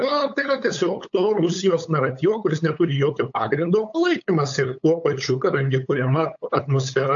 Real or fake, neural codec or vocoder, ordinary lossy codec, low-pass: fake; codec, 16 kHz, 8 kbps, FunCodec, trained on LibriTTS, 25 frames a second; AAC, 64 kbps; 7.2 kHz